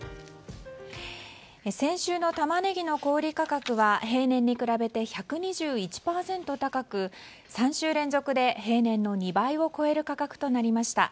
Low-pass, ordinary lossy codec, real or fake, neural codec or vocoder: none; none; real; none